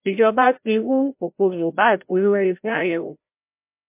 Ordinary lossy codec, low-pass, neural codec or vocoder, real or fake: MP3, 32 kbps; 3.6 kHz; codec, 16 kHz, 0.5 kbps, FreqCodec, larger model; fake